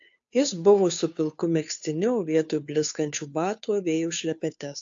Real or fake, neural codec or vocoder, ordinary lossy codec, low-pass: fake; codec, 16 kHz, 2 kbps, FunCodec, trained on Chinese and English, 25 frames a second; AAC, 64 kbps; 7.2 kHz